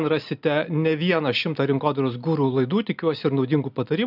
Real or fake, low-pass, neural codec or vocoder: real; 5.4 kHz; none